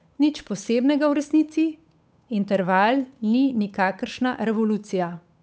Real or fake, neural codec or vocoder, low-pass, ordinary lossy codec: fake; codec, 16 kHz, 8 kbps, FunCodec, trained on Chinese and English, 25 frames a second; none; none